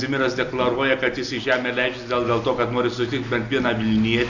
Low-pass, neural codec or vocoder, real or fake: 7.2 kHz; none; real